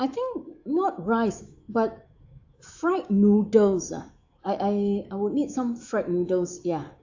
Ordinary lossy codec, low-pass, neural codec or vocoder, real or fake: AAC, 48 kbps; 7.2 kHz; autoencoder, 48 kHz, 128 numbers a frame, DAC-VAE, trained on Japanese speech; fake